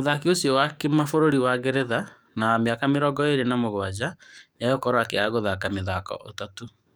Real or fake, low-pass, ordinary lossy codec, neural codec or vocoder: fake; none; none; codec, 44.1 kHz, 7.8 kbps, DAC